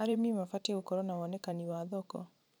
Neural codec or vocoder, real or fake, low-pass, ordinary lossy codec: vocoder, 44.1 kHz, 128 mel bands every 512 samples, BigVGAN v2; fake; none; none